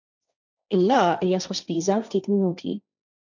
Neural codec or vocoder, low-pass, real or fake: codec, 16 kHz, 1.1 kbps, Voila-Tokenizer; 7.2 kHz; fake